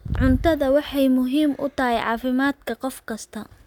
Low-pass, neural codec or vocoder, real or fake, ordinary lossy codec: 19.8 kHz; none; real; none